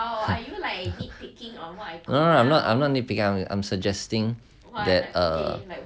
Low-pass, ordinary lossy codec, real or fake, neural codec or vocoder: none; none; real; none